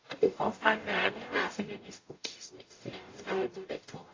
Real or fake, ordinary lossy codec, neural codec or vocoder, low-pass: fake; AAC, 32 kbps; codec, 44.1 kHz, 0.9 kbps, DAC; 7.2 kHz